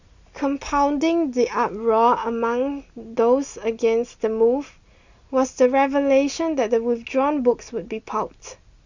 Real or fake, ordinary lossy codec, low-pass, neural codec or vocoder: real; Opus, 64 kbps; 7.2 kHz; none